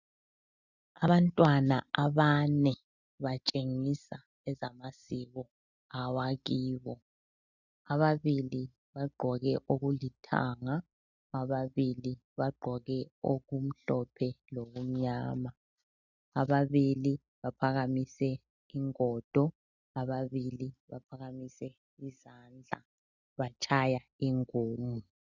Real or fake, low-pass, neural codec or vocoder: real; 7.2 kHz; none